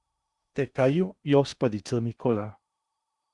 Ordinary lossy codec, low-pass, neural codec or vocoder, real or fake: Opus, 64 kbps; 10.8 kHz; codec, 16 kHz in and 24 kHz out, 0.8 kbps, FocalCodec, streaming, 65536 codes; fake